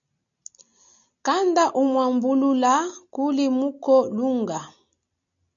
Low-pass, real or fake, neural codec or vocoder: 7.2 kHz; real; none